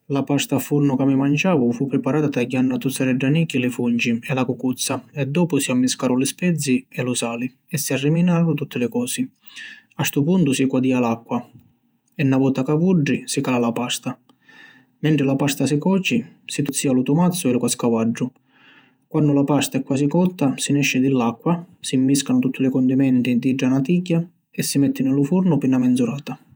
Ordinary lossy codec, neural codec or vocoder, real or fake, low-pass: none; vocoder, 48 kHz, 128 mel bands, Vocos; fake; none